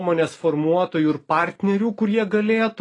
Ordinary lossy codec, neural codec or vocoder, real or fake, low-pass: AAC, 32 kbps; none; real; 10.8 kHz